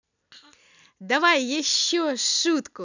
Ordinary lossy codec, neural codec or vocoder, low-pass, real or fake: none; none; 7.2 kHz; real